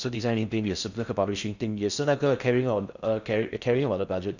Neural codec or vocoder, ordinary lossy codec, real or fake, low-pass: codec, 16 kHz in and 24 kHz out, 0.6 kbps, FocalCodec, streaming, 4096 codes; none; fake; 7.2 kHz